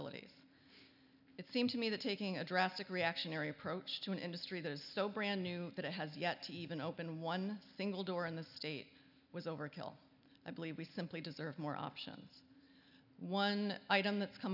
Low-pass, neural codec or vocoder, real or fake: 5.4 kHz; none; real